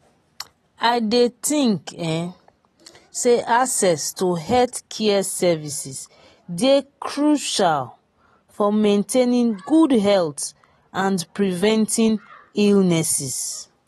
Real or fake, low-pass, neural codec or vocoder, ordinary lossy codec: real; 19.8 kHz; none; AAC, 32 kbps